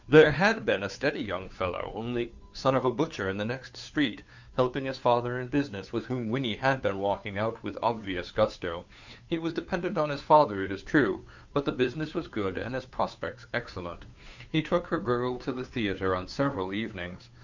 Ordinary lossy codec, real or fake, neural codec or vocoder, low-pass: Opus, 64 kbps; fake; codec, 16 kHz, 2 kbps, FunCodec, trained on Chinese and English, 25 frames a second; 7.2 kHz